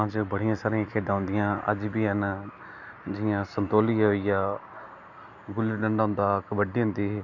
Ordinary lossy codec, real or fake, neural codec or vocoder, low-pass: none; real; none; 7.2 kHz